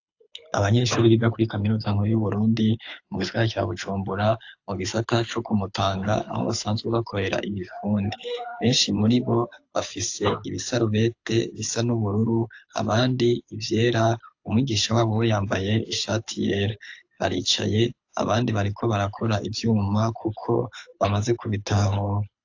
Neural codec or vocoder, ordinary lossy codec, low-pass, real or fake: codec, 24 kHz, 6 kbps, HILCodec; AAC, 48 kbps; 7.2 kHz; fake